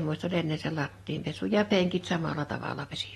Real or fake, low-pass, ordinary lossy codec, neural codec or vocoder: real; 19.8 kHz; AAC, 32 kbps; none